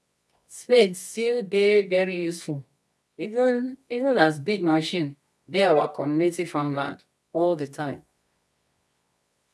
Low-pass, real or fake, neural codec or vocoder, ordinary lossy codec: none; fake; codec, 24 kHz, 0.9 kbps, WavTokenizer, medium music audio release; none